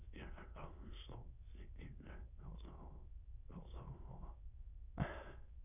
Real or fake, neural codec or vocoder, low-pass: fake; autoencoder, 22.05 kHz, a latent of 192 numbers a frame, VITS, trained on many speakers; 3.6 kHz